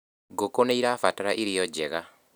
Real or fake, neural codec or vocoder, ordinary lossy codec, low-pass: real; none; none; none